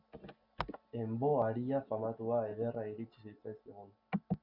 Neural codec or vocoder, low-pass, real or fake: none; 5.4 kHz; real